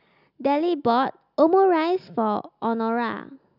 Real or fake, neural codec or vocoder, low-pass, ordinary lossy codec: real; none; 5.4 kHz; none